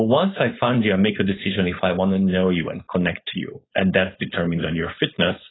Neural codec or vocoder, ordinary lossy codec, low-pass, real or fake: codec, 16 kHz, 4.8 kbps, FACodec; AAC, 16 kbps; 7.2 kHz; fake